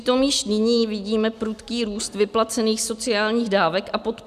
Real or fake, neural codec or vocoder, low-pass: real; none; 14.4 kHz